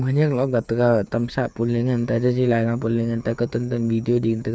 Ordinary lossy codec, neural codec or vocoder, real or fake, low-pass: none; codec, 16 kHz, 8 kbps, FreqCodec, smaller model; fake; none